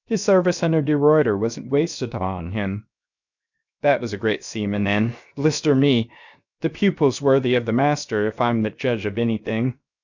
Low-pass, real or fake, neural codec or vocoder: 7.2 kHz; fake; codec, 16 kHz, 0.7 kbps, FocalCodec